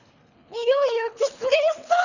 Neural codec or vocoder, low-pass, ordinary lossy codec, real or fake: codec, 24 kHz, 3 kbps, HILCodec; 7.2 kHz; none; fake